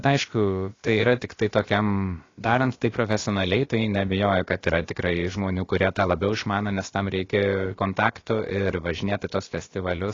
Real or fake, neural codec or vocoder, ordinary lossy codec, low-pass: fake; codec, 16 kHz, about 1 kbps, DyCAST, with the encoder's durations; AAC, 32 kbps; 7.2 kHz